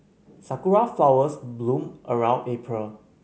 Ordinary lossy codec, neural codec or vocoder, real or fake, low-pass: none; none; real; none